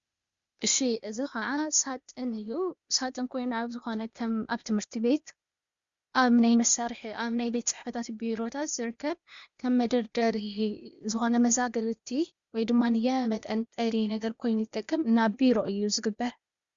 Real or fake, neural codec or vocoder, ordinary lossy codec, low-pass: fake; codec, 16 kHz, 0.8 kbps, ZipCodec; Opus, 64 kbps; 7.2 kHz